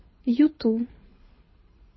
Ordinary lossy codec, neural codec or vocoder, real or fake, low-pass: MP3, 24 kbps; codec, 24 kHz, 6 kbps, HILCodec; fake; 7.2 kHz